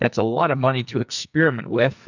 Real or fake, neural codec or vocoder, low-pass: fake; codec, 44.1 kHz, 2.6 kbps, SNAC; 7.2 kHz